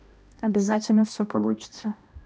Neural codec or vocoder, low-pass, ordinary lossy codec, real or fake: codec, 16 kHz, 1 kbps, X-Codec, HuBERT features, trained on balanced general audio; none; none; fake